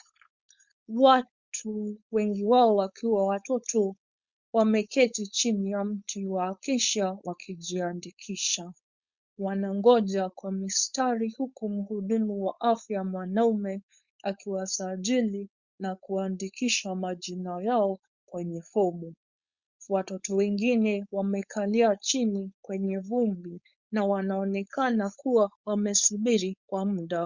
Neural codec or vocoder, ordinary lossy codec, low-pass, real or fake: codec, 16 kHz, 4.8 kbps, FACodec; Opus, 64 kbps; 7.2 kHz; fake